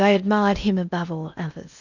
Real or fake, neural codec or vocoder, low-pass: fake; codec, 16 kHz in and 24 kHz out, 0.8 kbps, FocalCodec, streaming, 65536 codes; 7.2 kHz